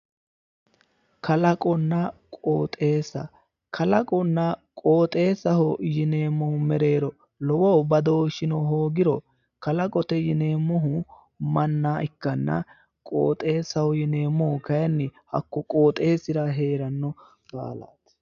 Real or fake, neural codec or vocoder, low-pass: real; none; 7.2 kHz